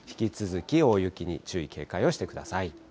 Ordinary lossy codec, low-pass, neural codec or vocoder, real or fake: none; none; none; real